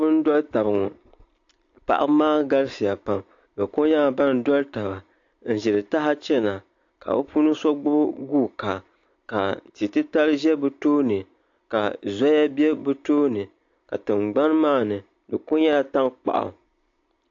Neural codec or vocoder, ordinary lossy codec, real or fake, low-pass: none; AAC, 48 kbps; real; 7.2 kHz